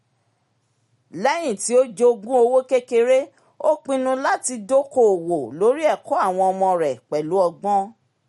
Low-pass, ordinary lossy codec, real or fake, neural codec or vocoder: 19.8 kHz; MP3, 48 kbps; real; none